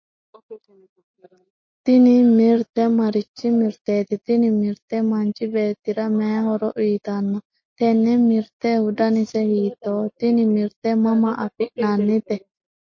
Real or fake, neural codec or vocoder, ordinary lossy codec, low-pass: real; none; MP3, 32 kbps; 7.2 kHz